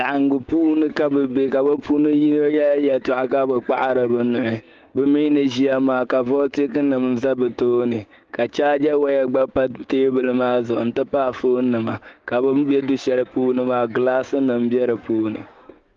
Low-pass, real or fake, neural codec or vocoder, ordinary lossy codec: 7.2 kHz; fake; codec, 16 kHz, 6 kbps, DAC; Opus, 32 kbps